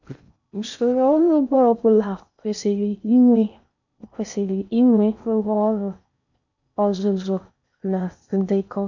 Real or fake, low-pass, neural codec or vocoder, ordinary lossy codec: fake; 7.2 kHz; codec, 16 kHz in and 24 kHz out, 0.6 kbps, FocalCodec, streaming, 2048 codes; none